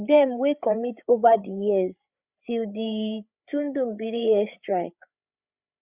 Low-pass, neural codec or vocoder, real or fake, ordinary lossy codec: 3.6 kHz; codec, 16 kHz, 8 kbps, FreqCodec, larger model; fake; Opus, 64 kbps